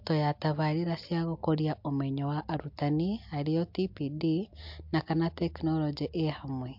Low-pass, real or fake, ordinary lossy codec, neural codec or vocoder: 5.4 kHz; real; MP3, 48 kbps; none